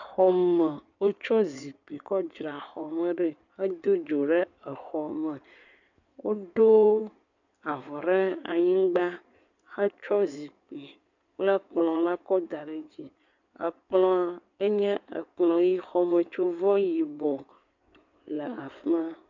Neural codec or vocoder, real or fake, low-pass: codec, 16 kHz in and 24 kHz out, 2.2 kbps, FireRedTTS-2 codec; fake; 7.2 kHz